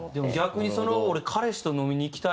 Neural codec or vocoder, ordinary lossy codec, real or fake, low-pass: none; none; real; none